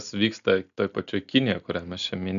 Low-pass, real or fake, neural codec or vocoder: 7.2 kHz; real; none